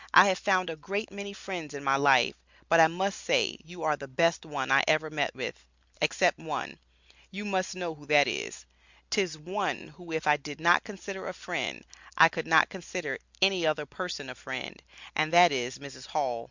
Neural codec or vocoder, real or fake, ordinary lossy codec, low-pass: none; real; Opus, 64 kbps; 7.2 kHz